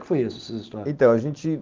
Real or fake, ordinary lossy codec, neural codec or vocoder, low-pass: real; Opus, 32 kbps; none; 7.2 kHz